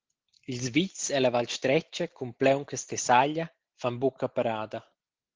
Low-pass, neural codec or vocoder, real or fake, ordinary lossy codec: 7.2 kHz; none; real; Opus, 16 kbps